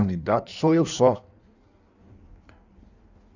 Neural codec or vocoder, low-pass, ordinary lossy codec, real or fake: codec, 16 kHz in and 24 kHz out, 1.1 kbps, FireRedTTS-2 codec; 7.2 kHz; none; fake